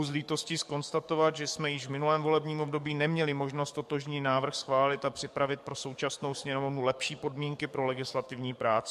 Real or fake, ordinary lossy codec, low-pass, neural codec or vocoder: fake; MP3, 64 kbps; 14.4 kHz; codec, 44.1 kHz, 7.8 kbps, DAC